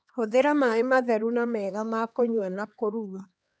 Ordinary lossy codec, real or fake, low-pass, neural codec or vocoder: none; fake; none; codec, 16 kHz, 2 kbps, X-Codec, HuBERT features, trained on LibriSpeech